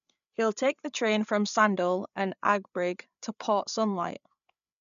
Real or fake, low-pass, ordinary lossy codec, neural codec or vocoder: fake; 7.2 kHz; none; codec, 16 kHz, 8 kbps, FreqCodec, larger model